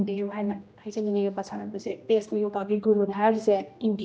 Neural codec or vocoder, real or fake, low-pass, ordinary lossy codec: codec, 16 kHz, 1 kbps, X-Codec, HuBERT features, trained on general audio; fake; none; none